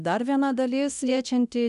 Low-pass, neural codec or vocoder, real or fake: 10.8 kHz; codec, 24 kHz, 0.9 kbps, DualCodec; fake